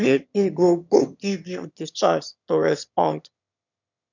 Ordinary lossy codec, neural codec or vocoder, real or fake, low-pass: none; autoencoder, 22.05 kHz, a latent of 192 numbers a frame, VITS, trained on one speaker; fake; 7.2 kHz